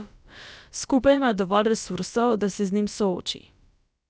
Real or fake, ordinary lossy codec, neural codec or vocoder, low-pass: fake; none; codec, 16 kHz, about 1 kbps, DyCAST, with the encoder's durations; none